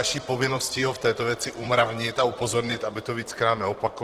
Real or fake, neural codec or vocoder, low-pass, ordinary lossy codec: fake; vocoder, 44.1 kHz, 128 mel bands, Pupu-Vocoder; 14.4 kHz; Opus, 16 kbps